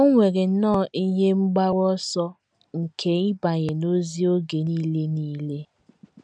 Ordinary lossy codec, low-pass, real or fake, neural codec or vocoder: none; 9.9 kHz; real; none